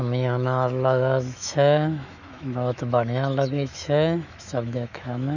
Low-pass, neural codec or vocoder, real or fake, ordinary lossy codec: 7.2 kHz; autoencoder, 48 kHz, 128 numbers a frame, DAC-VAE, trained on Japanese speech; fake; none